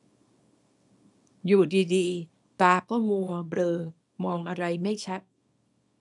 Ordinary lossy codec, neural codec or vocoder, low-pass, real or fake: none; codec, 24 kHz, 0.9 kbps, WavTokenizer, small release; 10.8 kHz; fake